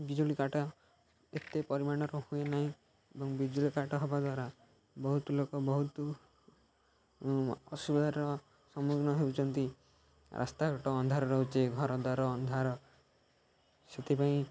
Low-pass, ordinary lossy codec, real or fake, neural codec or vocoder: none; none; real; none